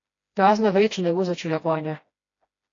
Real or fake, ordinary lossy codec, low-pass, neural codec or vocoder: fake; AAC, 48 kbps; 7.2 kHz; codec, 16 kHz, 1 kbps, FreqCodec, smaller model